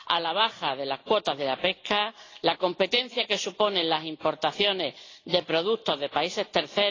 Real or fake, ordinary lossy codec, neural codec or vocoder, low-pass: real; AAC, 32 kbps; none; 7.2 kHz